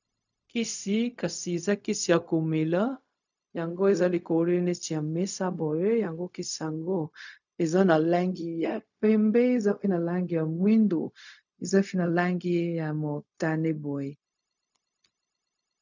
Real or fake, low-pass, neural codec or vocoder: fake; 7.2 kHz; codec, 16 kHz, 0.4 kbps, LongCat-Audio-Codec